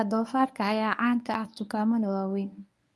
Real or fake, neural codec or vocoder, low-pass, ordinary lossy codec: fake; codec, 24 kHz, 0.9 kbps, WavTokenizer, medium speech release version 1; none; none